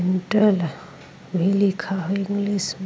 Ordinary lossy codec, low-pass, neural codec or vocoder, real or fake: none; none; none; real